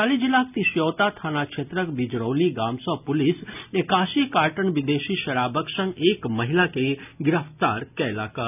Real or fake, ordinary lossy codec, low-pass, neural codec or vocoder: real; none; 3.6 kHz; none